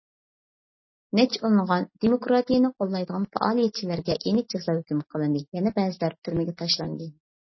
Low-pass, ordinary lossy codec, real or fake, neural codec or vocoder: 7.2 kHz; MP3, 24 kbps; real; none